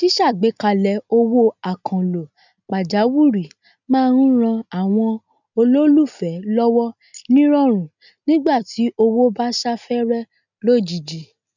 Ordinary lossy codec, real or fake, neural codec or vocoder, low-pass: none; real; none; 7.2 kHz